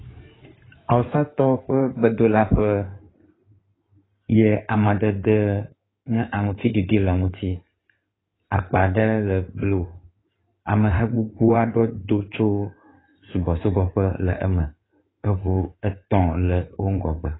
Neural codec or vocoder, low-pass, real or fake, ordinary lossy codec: codec, 16 kHz in and 24 kHz out, 2.2 kbps, FireRedTTS-2 codec; 7.2 kHz; fake; AAC, 16 kbps